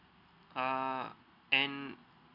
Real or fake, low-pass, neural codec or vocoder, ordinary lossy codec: real; 5.4 kHz; none; none